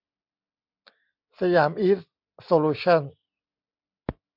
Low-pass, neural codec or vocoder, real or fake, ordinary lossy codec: 5.4 kHz; none; real; MP3, 48 kbps